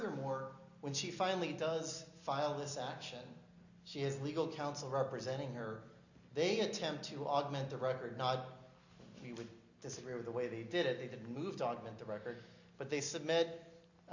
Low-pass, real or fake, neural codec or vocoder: 7.2 kHz; real; none